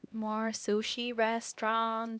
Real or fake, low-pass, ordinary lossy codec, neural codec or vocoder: fake; none; none; codec, 16 kHz, 1 kbps, X-Codec, HuBERT features, trained on LibriSpeech